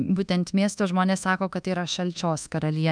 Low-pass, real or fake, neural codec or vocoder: 9.9 kHz; fake; codec, 24 kHz, 1.2 kbps, DualCodec